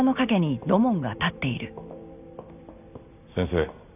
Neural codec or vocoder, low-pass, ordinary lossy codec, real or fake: none; 3.6 kHz; none; real